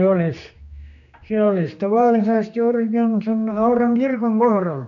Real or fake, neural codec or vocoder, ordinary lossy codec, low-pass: fake; codec, 16 kHz, 2 kbps, X-Codec, HuBERT features, trained on general audio; none; 7.2 kHz